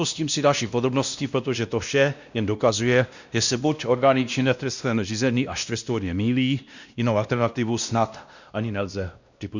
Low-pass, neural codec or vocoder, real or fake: 7.2 kHz; codec, 16 kHz, 1 kbps, X-Codec, WavLM features, trained on Multilingual LibriSpeech; fake